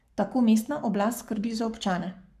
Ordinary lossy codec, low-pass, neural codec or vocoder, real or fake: none; 14.4 kHz; codec, 44.1 kHz, 7.8 kbps, Pupu-Codec; fake